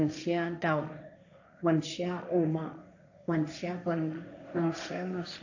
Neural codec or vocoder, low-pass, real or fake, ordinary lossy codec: codec, 16 kHz, 1.1 kbps, Voila-Tokenizer; 7.2 kHz; fake; AAC, 48 kbps